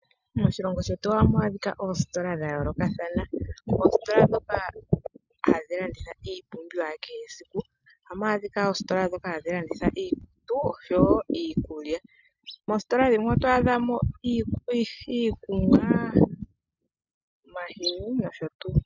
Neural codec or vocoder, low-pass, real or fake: none; 7.2 kHz; real